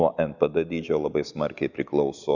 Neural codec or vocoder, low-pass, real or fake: none; 7.2 kHz; real